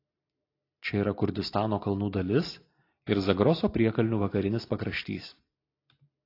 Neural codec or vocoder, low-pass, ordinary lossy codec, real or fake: none; 5.4 kHz; AAC, 32 kbps; real